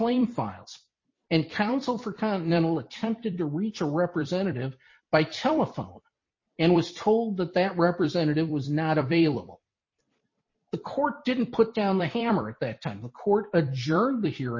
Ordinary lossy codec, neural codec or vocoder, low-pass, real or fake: MP3, 32 kbps; vocoder, 44.1 kHz, 128 mel bands every 256 samples, BigVGAN v2; 7.2 kHz; fake